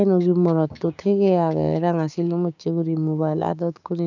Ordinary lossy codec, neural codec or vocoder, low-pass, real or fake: none; codec, 16 kHz, 6 kbps, DAC; 7.2 kHz; fake